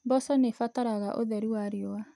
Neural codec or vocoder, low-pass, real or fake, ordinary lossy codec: none; none; real; none